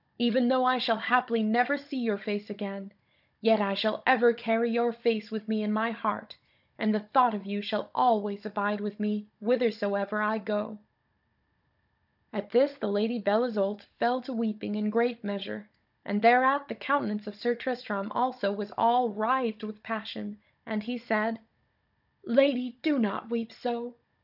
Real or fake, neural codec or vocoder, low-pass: fake; codec, 16 kHz, 16 kbps, FunCodec, trained on Chinese and English, 50 frames a second; 5.4 kHz